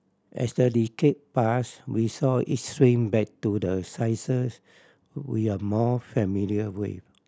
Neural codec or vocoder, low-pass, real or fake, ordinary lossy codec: none; none; real; none